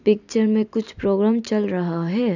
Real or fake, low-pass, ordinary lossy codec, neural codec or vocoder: real; 7.2 kHz; none; none